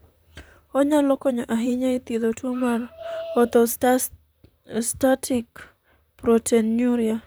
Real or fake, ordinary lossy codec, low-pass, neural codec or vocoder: fake; none; none; vocoder, 44.1 kHz, 128 mel bands, Pupu-Vocoder